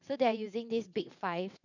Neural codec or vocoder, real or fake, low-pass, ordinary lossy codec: vocoder, 22.05 kHz, 80 mel bands, WaveNeXt; fake; 7.2 kHz; none